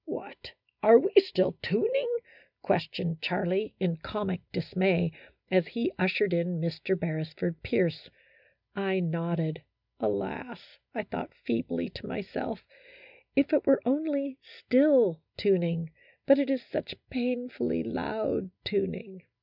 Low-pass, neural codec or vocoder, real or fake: 5.4 kHz; none; real